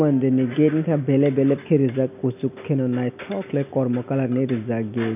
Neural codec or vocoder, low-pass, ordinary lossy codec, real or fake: none; 3.6 kHz; none; real